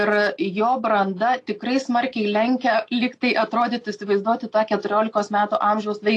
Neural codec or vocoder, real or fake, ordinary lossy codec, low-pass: none; real; AAC, 48 kbps; 10.8 kHz